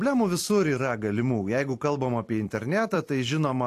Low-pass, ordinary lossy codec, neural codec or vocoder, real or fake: 14.4 kHz; AAC, 64 kbps; vocoder, 44.1 kHz, 128 mel bands every 256 samples, BigVGAN v2; fake